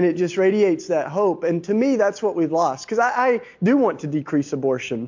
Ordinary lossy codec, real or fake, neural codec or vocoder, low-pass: MP3, 48 kbps; real; none; 7.2 kHz